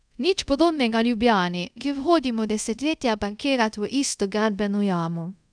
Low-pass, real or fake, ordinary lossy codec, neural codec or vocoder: 9.9 kHz; fake; none; codec, 24 kHz, 0.5 kbps, DualCodec